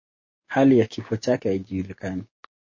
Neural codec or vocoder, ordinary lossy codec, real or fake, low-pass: codec, 16 kHz, 6 kbps, DAC; MP3, 32 kbps; fake; 7.2 kHz